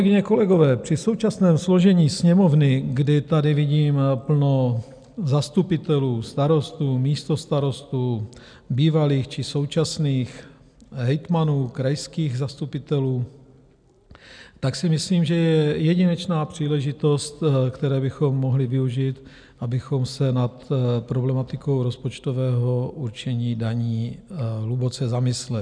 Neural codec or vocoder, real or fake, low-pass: none; real; 9.9 kHz